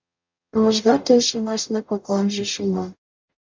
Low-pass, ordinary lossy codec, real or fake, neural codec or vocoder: 7.2 kHz; MP3, 64 kbps; fake; codec, 44.1 kHz, 0.9 kbps, DAC